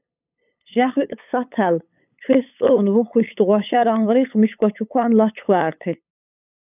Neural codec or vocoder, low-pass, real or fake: codec, 16 kHz, 8 kbps, FunCodec, trained on LibriTTS, 25 frames a second; 3.6 kHz; fake